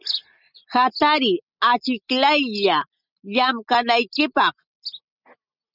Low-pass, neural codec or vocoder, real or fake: 5.4 kHz; none; real